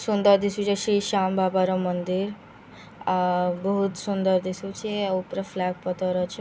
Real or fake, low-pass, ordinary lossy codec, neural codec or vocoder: real; none; none; none